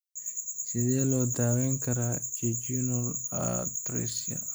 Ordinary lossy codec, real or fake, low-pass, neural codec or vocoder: none; real; none; none